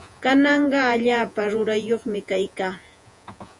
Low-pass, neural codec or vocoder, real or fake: 10.8 kHz; vocoder, 48 kHz, 128 mel bands, Vocos; fake